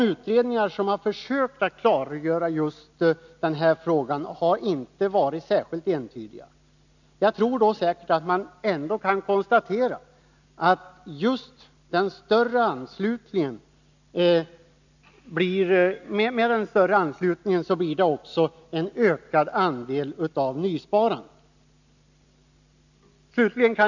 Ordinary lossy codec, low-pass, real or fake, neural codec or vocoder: none; 7.2 kHz; real; none